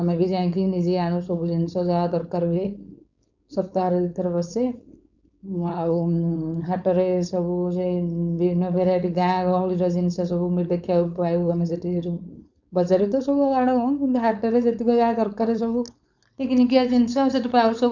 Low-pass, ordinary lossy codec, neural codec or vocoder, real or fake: 7.2 kHz; none; codec, 16 kHz, 4.8 kbps, FACodec; fake